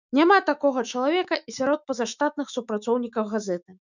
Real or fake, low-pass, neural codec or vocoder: fake; 7.2 kHz; autoencoder, 48 kHz, 128 numbers a frame, DAC-VAE, trained on Japanese speech